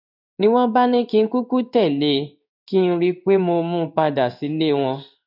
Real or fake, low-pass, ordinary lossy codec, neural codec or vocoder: fake; 5.4 kHz; none; codec, 16 kHz in and 24 kHz out, 1 kbps, XY-Tokenizer